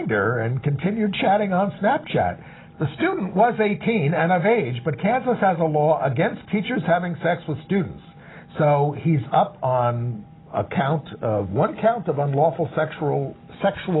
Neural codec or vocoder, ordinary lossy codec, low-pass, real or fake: none; AAC, 16 kbps; 7.2 kHz; real